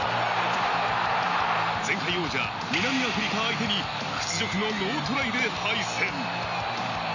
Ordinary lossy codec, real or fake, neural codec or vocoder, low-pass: none; real; none; 7.2 kHz